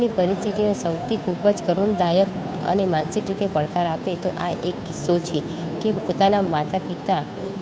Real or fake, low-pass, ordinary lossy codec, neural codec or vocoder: fake; none; none; codec, 16 kHz, 2 kbps, FunCodec, trained on Chinese and English, 25 frames a second